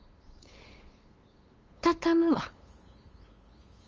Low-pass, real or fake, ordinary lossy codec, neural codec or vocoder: 7.2 kHz; fake; Opus, 32 kbps; codec, 16 kHz, 8 kbps, FunCodec, trained on LibriTTS, 25 frames a second